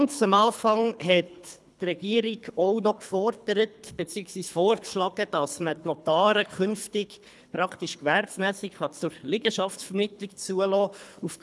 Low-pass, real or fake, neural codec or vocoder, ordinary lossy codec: none; fake; codec, 24 kHz, 3 kbps, HILCodec; none